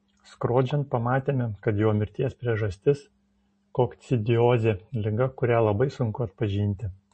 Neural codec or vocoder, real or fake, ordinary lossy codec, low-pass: none; real; MP3, 32 kbps; 10.8 kHz